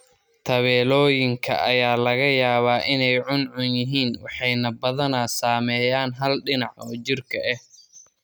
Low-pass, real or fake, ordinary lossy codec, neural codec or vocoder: none; real; none; none